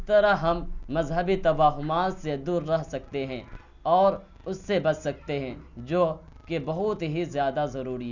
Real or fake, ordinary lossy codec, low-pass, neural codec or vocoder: real; none; 7.2 kHz; none